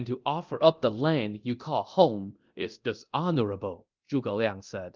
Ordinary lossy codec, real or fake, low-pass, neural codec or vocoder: Opus, 32 kbps; fake; 7.2 kHz; codec, 24 kHz, 0.9 kbps, DualCodec